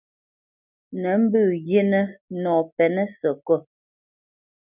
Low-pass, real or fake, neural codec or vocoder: 3.6 kHz; real; none